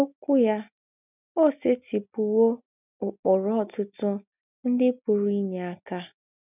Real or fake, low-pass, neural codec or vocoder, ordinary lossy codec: real; 3.6 kHz; none; none